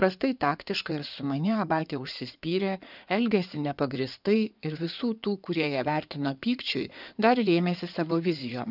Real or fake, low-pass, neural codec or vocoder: fake; 5.4 kHz; codec, 16 kHz in and 24 kHz out, 2.2 kbps, FireRedTTS-2 codec